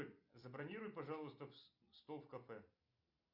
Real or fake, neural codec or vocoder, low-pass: real; none; 5.4 kHz